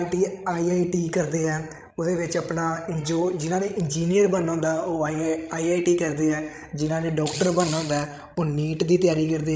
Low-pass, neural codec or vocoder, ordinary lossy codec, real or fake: none; codec, 16 kHz, 16 kbps, FreqCodec, larger model; none; fake